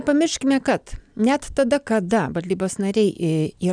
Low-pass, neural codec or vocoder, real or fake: 9.9 kHz; none; real